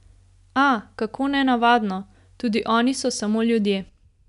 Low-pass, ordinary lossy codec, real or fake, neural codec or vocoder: 10.8 kHz; none; real; none